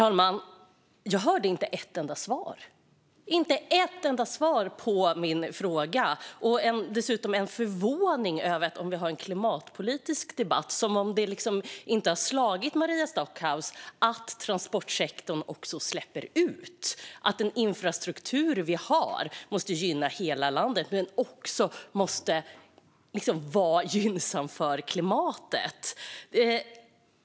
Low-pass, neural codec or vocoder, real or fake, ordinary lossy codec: none; none; real; none